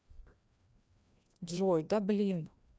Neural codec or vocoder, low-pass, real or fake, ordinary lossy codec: codec, 16 kHz, 1 kbps, FreqCodec, larger model; none; fake; none